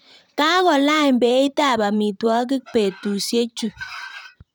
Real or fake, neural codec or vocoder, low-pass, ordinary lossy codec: fake; vocoder, 44.1 kHz, 128 mel bands, Pupu-Vocoder; none; none